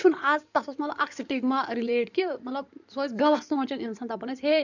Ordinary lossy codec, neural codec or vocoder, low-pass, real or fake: none; codec, 16 kHz in and 24 kHz out, 2.2 kbps, FireRedTTS-2 codec; 7.2 kHz; fake